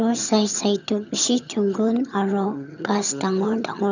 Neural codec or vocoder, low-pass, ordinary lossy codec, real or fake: vocoder, 22.05 kHz, 80 mel bands, HiFi-GAN; 7.2 kHz; none; fake